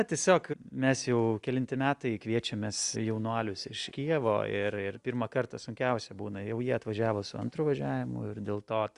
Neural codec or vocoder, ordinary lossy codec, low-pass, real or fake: none; Opus, 64 kbps; 10.8 kHz; real